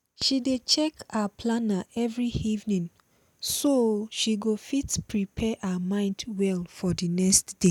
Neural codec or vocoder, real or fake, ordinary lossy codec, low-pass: none; real; none; 19.8 kHz